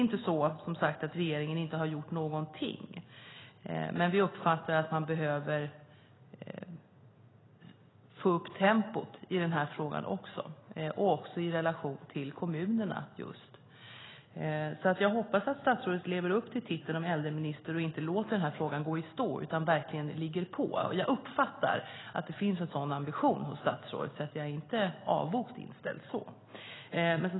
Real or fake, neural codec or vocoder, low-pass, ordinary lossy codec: real; none; 7.2 kHz; AAC, 16 kbps